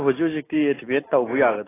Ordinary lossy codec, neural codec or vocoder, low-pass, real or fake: AAC, 16 kbps; none; 3.6 kHz; real